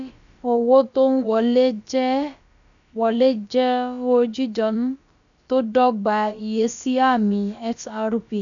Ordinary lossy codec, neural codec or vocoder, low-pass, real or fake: none; codec, 16 kHz, about 1 kbps, DyCAST, with the encoder's durations; 7.2 kHz; fake